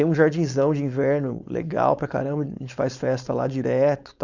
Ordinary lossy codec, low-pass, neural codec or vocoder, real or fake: none; 7.2 kHz; codec, 16 kHz, 4.8 kbps, FACodec; fake